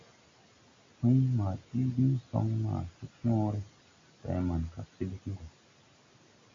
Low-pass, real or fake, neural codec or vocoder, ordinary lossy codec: 7.2 kHz; real; none; MP3, 48 kbps